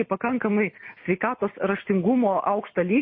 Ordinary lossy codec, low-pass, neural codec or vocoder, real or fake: MP3, 24 kbps; 7.2 kHz; none; real